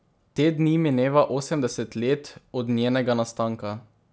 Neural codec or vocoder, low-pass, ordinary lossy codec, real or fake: none; none; none; real